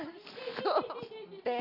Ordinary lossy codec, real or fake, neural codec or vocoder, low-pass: none; real; none; 5.4 kHz